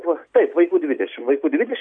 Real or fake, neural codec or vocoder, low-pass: real; none; 9.9 kHz